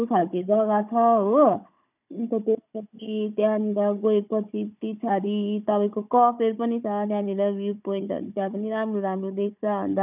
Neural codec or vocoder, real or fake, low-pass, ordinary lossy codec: codec, 16 kHz, 16 kbps, FunCodec, trained on Chinese and English, 50 frames a second; fake; 3.6 kHz; none